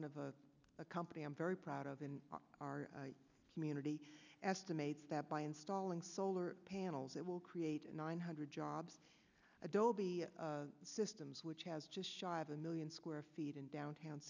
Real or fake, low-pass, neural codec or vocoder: real; 7.2 kHz; none